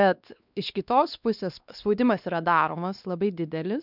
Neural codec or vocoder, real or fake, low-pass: codec, 16 kHz, 2 kbps, X-Codec, WavLM features, trained on Multilingual LibriSpeech; fake; 5.4 kHz